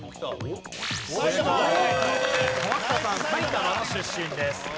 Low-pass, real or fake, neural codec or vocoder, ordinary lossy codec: none; real; none; none